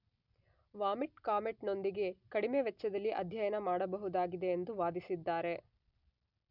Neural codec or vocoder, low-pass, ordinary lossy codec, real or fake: none; 5.4 kHz; none; real